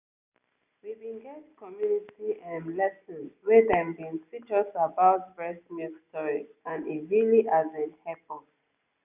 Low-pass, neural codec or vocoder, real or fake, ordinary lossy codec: 3.6 kHz; none; real; none